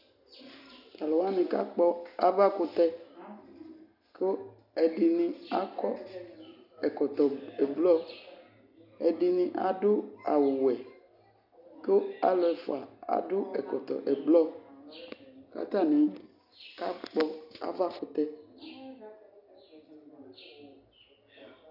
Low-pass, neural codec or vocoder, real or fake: 5.4 kHz; none; real